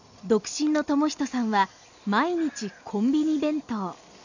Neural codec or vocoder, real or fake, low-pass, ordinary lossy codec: none; real; 7.2 kHz; none